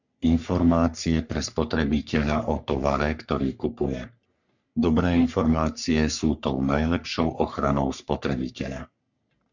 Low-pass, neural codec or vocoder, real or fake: 7.2 kHz; codec, 44.1 kHz, 3.4 kbps, Pupu-Codec; fake